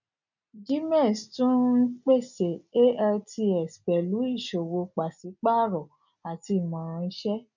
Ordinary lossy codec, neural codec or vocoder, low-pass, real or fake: none; none; 7.2 kHz; real